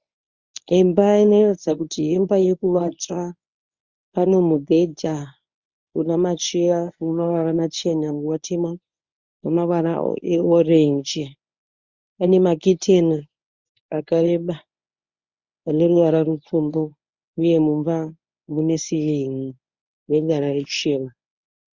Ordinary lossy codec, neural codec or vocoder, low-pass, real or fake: Opus, 64 kbps; codec, 24 kHz, 0.9 kbps, WavTokenizer, medium speech release version 1; 7.2 kHz; fake